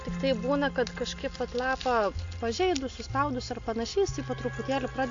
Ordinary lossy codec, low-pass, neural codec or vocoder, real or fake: AAC, 48 kbps; 7.2 kHz; none; real